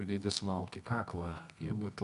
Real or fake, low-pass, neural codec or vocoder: fake; 10.8 kHz; codec, 24 kHz, 0.9 kbps, WavTokenizer, medium music audio release